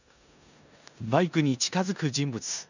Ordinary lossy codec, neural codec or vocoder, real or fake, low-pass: none; codec, 16 kHz in and 24 kHz out, 0.9 kbps, LongCat-Audio-Codec, four codebook decoder; fake; 7.2 kHz